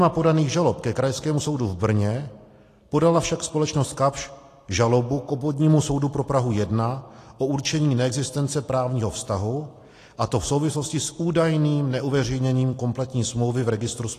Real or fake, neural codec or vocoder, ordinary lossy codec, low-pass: real; none; AAC, 48 kbps; 14.4 kHz